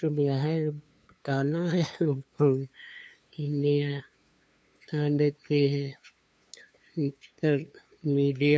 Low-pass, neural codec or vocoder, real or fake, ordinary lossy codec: none; codec, 16 kHz, 2 kbps, FunCodec, trained on LibriTTS, 25 frames a second; fake; none